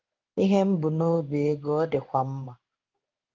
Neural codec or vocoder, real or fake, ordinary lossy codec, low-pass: codec, 16 kHz in and 24 kHz out, 1 kbps, XY-Tokenizer; fake; Opus, 24 kbps; 7.2 kHz